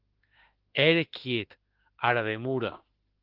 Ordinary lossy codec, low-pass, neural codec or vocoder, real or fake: Opus, 16 kbps; 5.4 kHz; autoencoder, 48 kHz, 32 numbers a frame, DAC-VAE, trained on Japanese speech; fake